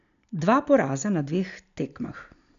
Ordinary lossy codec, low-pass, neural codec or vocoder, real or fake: none; 7.2 kHz; none; real